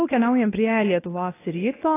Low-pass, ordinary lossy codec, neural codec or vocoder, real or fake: 3.6 kHz; AAC, 16 kbps; codec, 24 kHz, 0.9 kbps, WavTokenizer, medium speech release version 1; fake